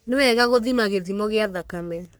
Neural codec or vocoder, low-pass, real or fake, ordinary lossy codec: codec, 44.1 kHz, 3.4 kbps, Pupu-Codec; none; fake; none